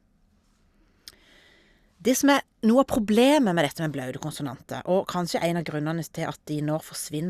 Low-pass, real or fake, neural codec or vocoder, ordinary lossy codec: 14.4 kHz; real; none; Opus, 64 kbps